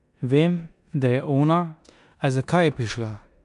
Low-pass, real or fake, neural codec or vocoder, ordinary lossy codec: 10.8 kHz; fake; codec, 16 kHz in and 24 kHz out, 0.9 kbps, LongCat-Audio-Codec, four codebook decoder; AAC, 96 kbps